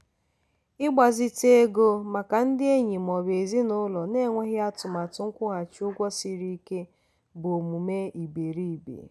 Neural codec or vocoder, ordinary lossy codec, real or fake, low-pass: none; none; real; none